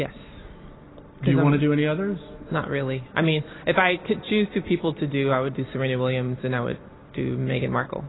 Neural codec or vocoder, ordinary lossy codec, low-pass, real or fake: none; AAC, 16 kbps; 7.2 kHz; real